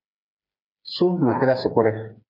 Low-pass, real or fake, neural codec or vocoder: 5.4 kHz; fake; codec, 16 kHz, 4 kbps, FreqCodec, smaller model